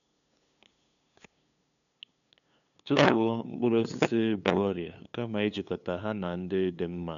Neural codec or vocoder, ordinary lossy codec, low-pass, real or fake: codec, 16 kHz, 2 kbps, FunCodec, trained on LibriTTS, 25 frames a second; none; 7.2 kHz; fake